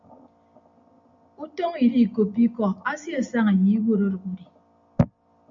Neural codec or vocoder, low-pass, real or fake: none; 7.2 kHz; real